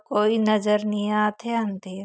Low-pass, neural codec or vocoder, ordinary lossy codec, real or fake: none; none; none; real